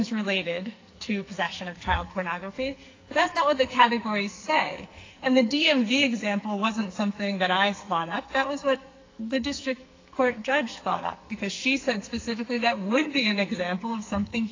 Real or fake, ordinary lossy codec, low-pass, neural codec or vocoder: fake; AAC, 32 kbps; 7.2 kHz; codec, 44.1 kHz, 2.6 kbps, SNAC